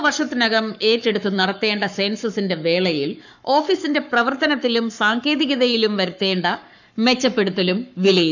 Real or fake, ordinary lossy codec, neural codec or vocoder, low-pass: fake; none; codec, 44.1 kHz, 7.8 kbps, Pupu-Codec; 7.2 kHz